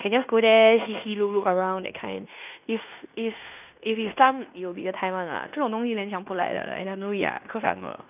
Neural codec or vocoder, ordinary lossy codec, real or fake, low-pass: codec, 16 kHz in and 24 kHz out, 0.9 kbps, LongCat-Audio-Codec, fine tuned four codebook decoder; none; fake; 3.6 kHz